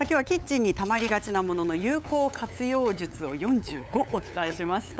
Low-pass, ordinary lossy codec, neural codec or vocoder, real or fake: none; none; codec, 16 kHz, 8 kbps, FunCodec, trained on LibriTTS, 25 frames a second; fake